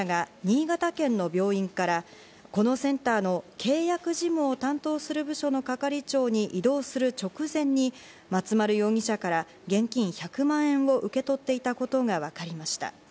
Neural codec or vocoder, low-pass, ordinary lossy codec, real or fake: none; none; none; real